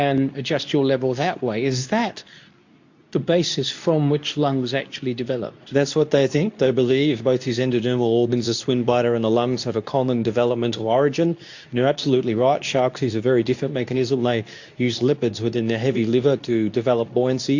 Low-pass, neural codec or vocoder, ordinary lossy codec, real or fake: 7.2 kHz; codec, 24 kHz, 0.9 kbps, WavTokenizer, medium speech release version 2; AAC, 48 kbps; fake